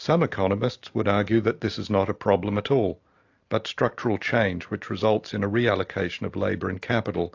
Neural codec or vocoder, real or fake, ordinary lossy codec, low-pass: none; real; AAC, 48 kbps; 7.2 kHz